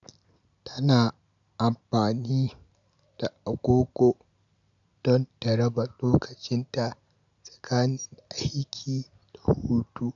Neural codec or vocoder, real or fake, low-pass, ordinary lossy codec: none; real; 7.2 kHz; none